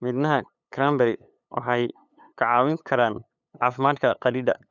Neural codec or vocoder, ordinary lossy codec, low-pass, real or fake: codec, 16 kHz, 8 kbps, FunCodec, trained on LibriTTS, 25 frames a second; none; 7.2 kHz; fake